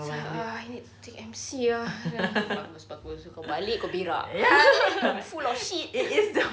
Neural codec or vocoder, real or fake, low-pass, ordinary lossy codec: none; real; none; none